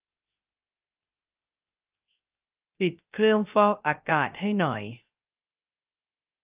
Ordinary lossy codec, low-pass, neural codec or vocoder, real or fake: Opus, 24 kbps; 3.6 kHz; codec, 16 kHz, 0.3 kbps, FocalCodec; fake